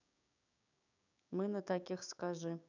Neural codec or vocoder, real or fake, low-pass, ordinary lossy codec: codec, 16 kHz, 6 kbps, DAC; fake; 7.2 kHz; none